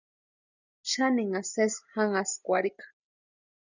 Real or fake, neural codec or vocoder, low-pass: real; none; 7.2 kHz